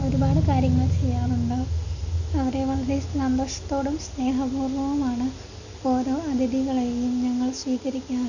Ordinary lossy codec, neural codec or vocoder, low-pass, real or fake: none; none; 7.2 kHz; real